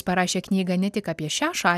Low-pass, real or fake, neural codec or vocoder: 14.4 kHz; real; none